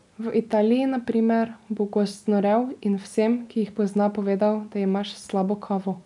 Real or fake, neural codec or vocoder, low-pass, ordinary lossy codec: real; none; 10.8 kHz; none